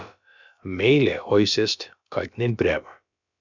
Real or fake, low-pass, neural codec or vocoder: fake; 7.2 kHz; codec, 16 kHz, about 1 kbps, DyCAST, with the encoder's durations